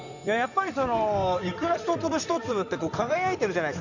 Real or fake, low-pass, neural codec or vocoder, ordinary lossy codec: fake; 7.2 kHz; codec, 16 kHz in and 24 kHz out, 2.2 kbps, FireRedTTS-2 codec; none